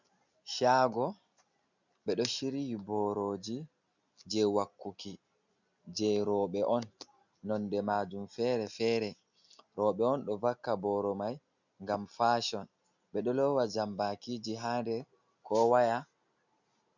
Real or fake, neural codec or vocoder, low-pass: real; none; 7.2 kHz